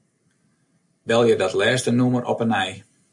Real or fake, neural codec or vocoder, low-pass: real; none; 10.8 kHz